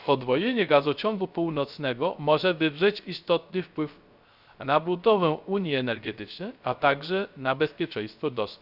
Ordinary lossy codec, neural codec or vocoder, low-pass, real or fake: Opus, 64 kbps; codec, 16 kHz, 0.3 kbps, FocalCodec; 5.4 kHz; fake